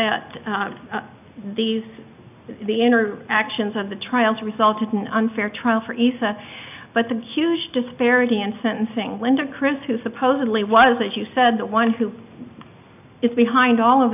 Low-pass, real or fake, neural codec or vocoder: 3.6 kHz; real; none